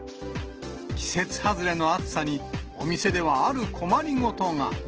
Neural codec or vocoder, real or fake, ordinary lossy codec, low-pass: none; real; Opus, 16 kbps; 7.2 kHz